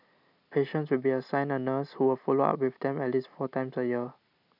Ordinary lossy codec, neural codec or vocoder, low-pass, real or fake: none; none; 5.4 kHz; real